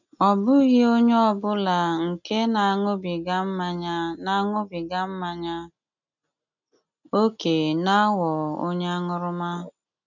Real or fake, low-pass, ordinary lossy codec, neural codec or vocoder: real; 7.2 kHz; none; none